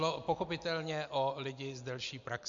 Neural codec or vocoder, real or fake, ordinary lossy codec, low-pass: none; real; AAC, 64 kbps; 7.2 kHz